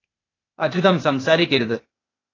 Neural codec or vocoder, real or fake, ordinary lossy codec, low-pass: codec, 16 kHz, 0.8 kbps, ZipCodec; fake; AAC, 32 kbps; 7.2 kHz